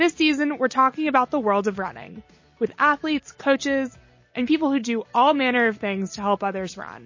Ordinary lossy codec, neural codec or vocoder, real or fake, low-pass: MP3, 32 kbps; none; real; 7.2 kHz